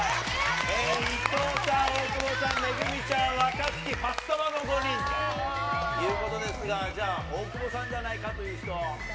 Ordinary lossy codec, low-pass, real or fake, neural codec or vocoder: none; none; real; none